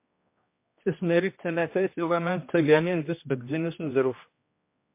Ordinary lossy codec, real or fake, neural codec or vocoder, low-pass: MP3, 24 kbps; fake; codec, 16 kHz, 1 kbps, X-Codec, HuBERT features, trained on general audio; 3.6 kHz